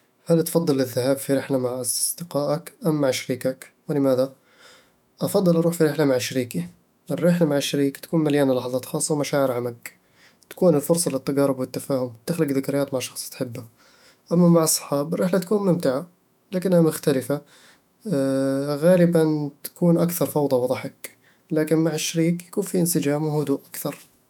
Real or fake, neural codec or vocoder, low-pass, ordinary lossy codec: fake; autoencoder, 48 kHz, 128 numbers a frame, DAC-VAE, trained on Japanese speech; 19.8 kHz; none